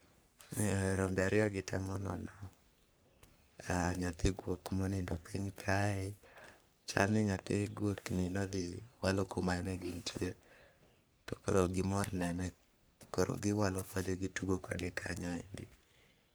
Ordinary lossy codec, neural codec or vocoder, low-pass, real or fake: none; codec, 44.1 kHz, 3.4 kbps, Pupu-Codec; none; fake